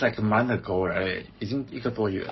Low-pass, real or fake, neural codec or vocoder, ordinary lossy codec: 7.2 kHz; fake; codec, 44.1 kHz, 7.8 kbps, Pupu-Codec; MP3, 24 kbps